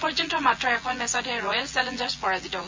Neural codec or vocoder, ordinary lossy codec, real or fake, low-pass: vocoder, 24 kHz, 100 mel bands, Vocos; MP3, 64 kbps; fake; 7.2 kHz